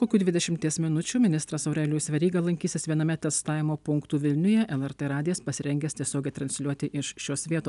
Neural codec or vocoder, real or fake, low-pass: none; real; 10.8 kHz